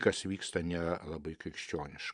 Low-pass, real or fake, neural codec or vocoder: 10.8 kHz; fake; vocoder, 44.1 kHz, 128 mel bands every 512 samples, BigVGAN v2